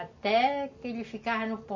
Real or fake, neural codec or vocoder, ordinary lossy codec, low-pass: real; none; MP3, 32 kbps; 7.2 kHz